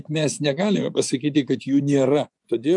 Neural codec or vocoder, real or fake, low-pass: vocoder, 24 kHz, 100 mel bands, Vocos; fake; 10.8 kHz